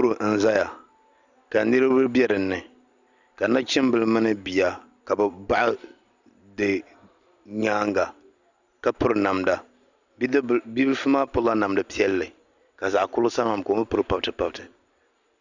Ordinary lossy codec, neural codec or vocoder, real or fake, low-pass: Opus, 64 kbps; none; real; 7.2 kHz